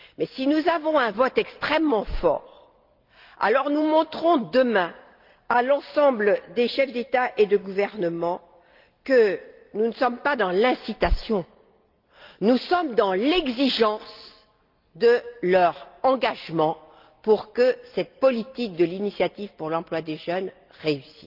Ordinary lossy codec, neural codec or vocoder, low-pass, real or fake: Opus, 32 kbps; none; 5.4 kHz; real